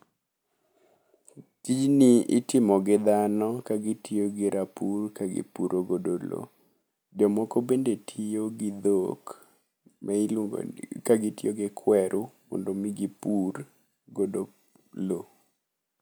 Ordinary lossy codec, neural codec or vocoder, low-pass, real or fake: none; none; none; real